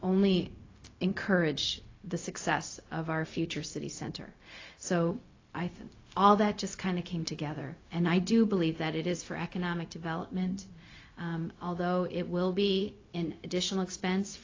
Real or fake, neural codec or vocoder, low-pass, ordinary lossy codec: fake; codec, 16 kHz, 0.4 kbps, LongCat-Audio-Codec; 7.2 kHz; AAC, 32 kbps